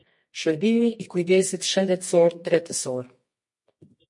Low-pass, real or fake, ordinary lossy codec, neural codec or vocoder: 10.8 kHz; fake; MP3, 48 kbps; codec, 24 kHz, 0.9 kbps, WavTokenizer, medium music audio release